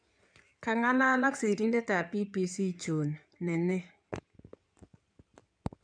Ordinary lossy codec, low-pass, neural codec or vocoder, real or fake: none; 9.9 kHz; codec, 16 kHz in and 24 kHz out, 2.2 kbps, FireRedTTS-2 codec; fake